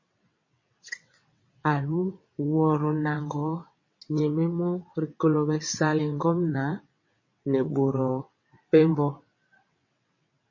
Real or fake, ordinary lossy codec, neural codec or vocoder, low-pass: fake; MP3, 32 kbps; vocoder, 22.05 kHz, 80 mel bands, WaveNeXt; 7.2 kHz